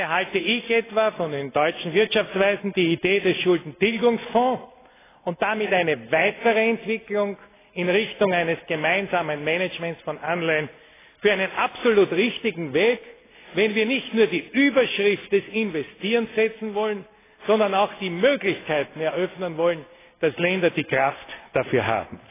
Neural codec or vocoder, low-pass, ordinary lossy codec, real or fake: none; 3.6 kHz; AAC, 16 kbps; real